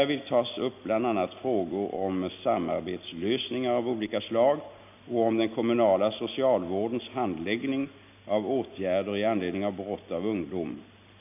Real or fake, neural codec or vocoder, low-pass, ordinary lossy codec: real; none; 3.6 kHz; none